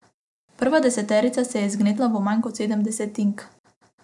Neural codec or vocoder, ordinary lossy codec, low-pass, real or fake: none; none; 10.8 kHz; real